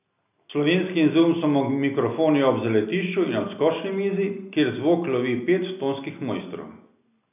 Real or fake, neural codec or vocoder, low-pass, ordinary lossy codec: real; none; 3.6 kHz; none